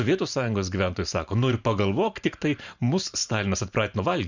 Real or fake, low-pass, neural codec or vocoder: fake; 7.2 kHz; vocoder, 44.1 kHz, 128 mel bands every 512 samples, BigVGAN v2